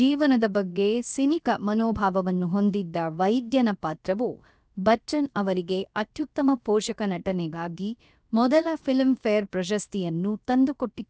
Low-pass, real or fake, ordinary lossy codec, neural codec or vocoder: none; fake; none; codec, 16 kHz, about 1 kbps, DyCAST, with the encoder's durations